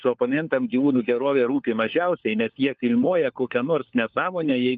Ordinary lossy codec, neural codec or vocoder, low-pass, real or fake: Opus, 24 kbps; codec, 16 kHz, 4 kbps, FunCodec, trained on LibriTTS, 50 frames a second; 7.2 kHz; fake